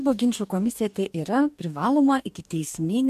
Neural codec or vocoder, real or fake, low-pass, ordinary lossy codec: codec, 44.1 kHz, 2.6 kbps, DAC; fake; 14.4 kHz; MP3, 96 kbps